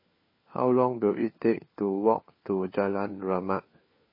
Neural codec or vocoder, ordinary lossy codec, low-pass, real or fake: codec, 16 kHz, 4 kbps, FunCodec, trained on LibriTTS, 50 frames a second; MP3, 24 kbps; 5.4 kHz; fake